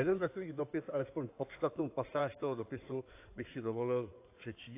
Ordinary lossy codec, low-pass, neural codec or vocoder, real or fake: AAC, 24 kbps; 3.6 kHz; codec, 16 kHz in and 24 kHz out, 2.2 kbps, FireRedTTS-2 codec; fake